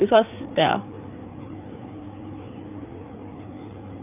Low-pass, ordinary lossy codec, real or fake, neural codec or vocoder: 3.6 kHz; none; fake; codec, 16 kHz, 4 kbps, FreqCodec, larger model